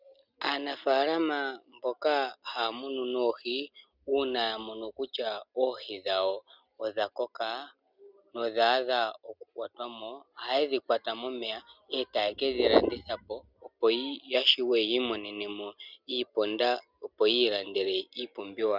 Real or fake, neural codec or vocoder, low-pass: real; none; 5.4 kHz